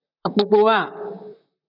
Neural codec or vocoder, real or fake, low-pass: vocoder, 44.1 kHz, 128 mel bands, Pupu-Vocoder; fake; 5.4 kHz